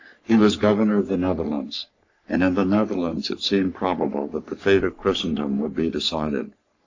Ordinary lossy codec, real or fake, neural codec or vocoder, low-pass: AAC, 48 kbps; fake; codec, 44.1 kHz, 3.4 kbps, Pupu-Codec; 7.2 kHz